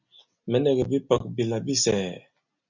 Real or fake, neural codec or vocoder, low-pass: real; none; 7.2 kHz